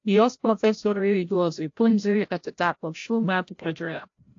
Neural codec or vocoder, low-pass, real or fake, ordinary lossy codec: codec, 16 kHz, 0.5 kbps, FreqCodec, larger model; 7.2 kHz; fake; AAC, 48 kbps